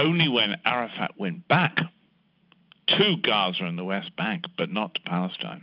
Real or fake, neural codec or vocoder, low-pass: real; none; 5.4 kHz